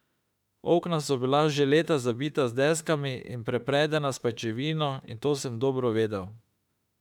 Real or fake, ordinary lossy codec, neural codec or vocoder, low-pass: fake; none; autoencoder, 48 kHz, 32 numbers a frame, DAC-VAE, trained on Japanese speech; 19.8 kHz